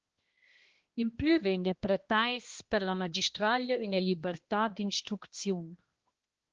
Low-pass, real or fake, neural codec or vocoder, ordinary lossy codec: 7.2 kHz; fake; codec, 16 kHz, 1 kbps, X-Codec, HuBERT features, trained on balanced general audio; Opus, 16 kbps